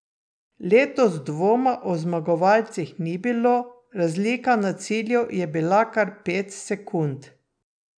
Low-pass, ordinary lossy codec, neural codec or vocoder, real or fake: 9.9 kHz; none; none; real